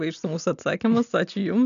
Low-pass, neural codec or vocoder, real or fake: 7.2 kHz; none; real